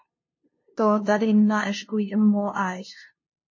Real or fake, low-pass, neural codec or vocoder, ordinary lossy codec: fake; 7.2 kHz; codec, 16 kHz, 0.5 kbps, FunCodec, trained on LibriTTS, 25 frames a second; MP3, 32 kbps